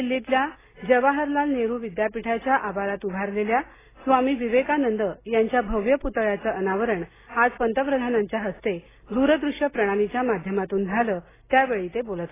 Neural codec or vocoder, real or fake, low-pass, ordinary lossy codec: none; real; 3.6 kHz; AAC, 16 kbps